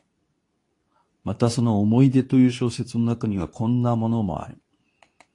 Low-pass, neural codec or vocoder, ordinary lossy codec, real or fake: 10.8 kHz; codec, 24 kHz, 0.9 kbps, WavTokenizer, medium speech release version 2; AAC, 48 kbps; fake